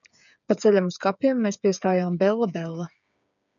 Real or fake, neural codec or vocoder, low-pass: fake; codec, 16 kHz, 8 kbps, FreqCodec, smaller model; 7.2 kHz